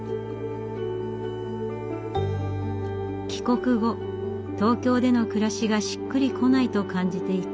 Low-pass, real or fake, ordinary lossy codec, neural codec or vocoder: none; real; none; none